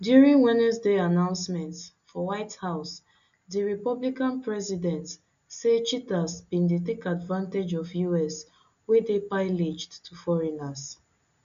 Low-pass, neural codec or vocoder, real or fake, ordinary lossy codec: 7.2 kHz; none; real; none